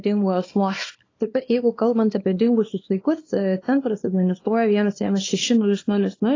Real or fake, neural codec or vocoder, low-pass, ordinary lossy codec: fake; codec, 24 kHz, 0.9 kbps, WavTokenizer, small release; 7.2 kHz; AAC, 32 kbps